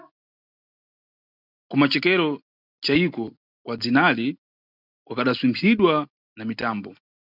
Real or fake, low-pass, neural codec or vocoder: real; 5.4 kHz; none